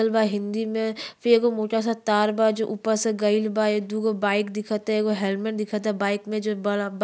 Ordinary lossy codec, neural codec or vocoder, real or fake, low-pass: none; none; real; none